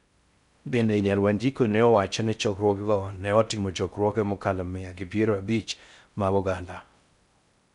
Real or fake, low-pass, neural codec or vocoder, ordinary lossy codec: fake; 10.8 kHz; codec, 16 kHz in and 24 kHz out, 0.6 kbps, FocalCodec, streaming, 4096 codes; none